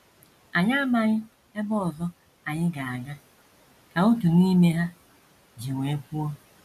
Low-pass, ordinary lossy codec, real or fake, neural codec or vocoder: 14.4 kHz; none; real; none